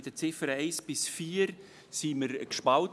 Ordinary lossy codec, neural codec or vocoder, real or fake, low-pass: none; none; real; none